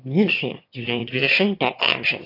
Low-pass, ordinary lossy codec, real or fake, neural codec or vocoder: 5.4 kHz; AAC, 32 kbps; fake; autoencoder, 22.05 kHz, a latent of 192 numbers a frame, VITS, trained on one speaker